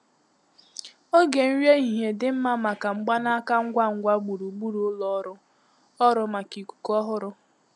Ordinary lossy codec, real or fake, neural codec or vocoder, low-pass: none; real; none; none